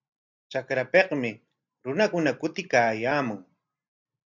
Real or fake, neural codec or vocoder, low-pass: real; none; 7.2 kHz